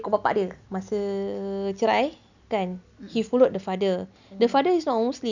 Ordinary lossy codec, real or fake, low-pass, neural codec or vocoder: none; real; 7.2 kHz; none